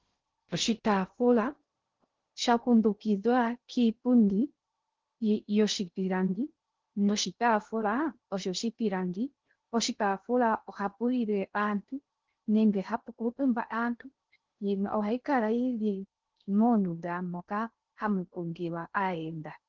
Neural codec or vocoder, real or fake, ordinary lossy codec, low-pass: codec, 16 kHz in and 24 kHz out, 0.6 kbps, FocalCodec, streaming, 2048 codes; fake; Opus, 16 kbps; 7.2 kHz